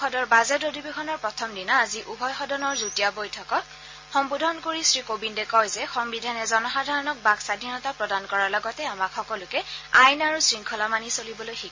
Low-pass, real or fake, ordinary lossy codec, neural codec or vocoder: 7.2 kHz; real; MP3, 32 kbps; none